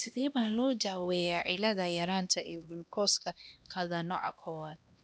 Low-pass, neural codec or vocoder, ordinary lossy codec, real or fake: none; codec, 16 kHz, 1 kbps, X-Codec, HuBERT features, trained on LibriSpeech; none; fake